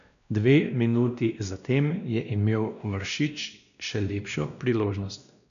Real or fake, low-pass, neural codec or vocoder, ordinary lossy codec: fake; 7.2 kHz; codec, 16 kHz, 1 kbps, X-Codec, WavLM features, trained on Multilingual LibriSpeech; none